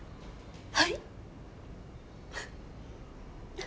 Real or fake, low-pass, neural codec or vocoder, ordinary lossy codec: real; none; none; none